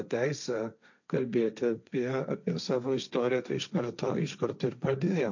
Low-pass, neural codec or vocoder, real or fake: 7.2 kHz; codec, 16 kHz, 1.1 kbps, Voila-Tokenizer; fake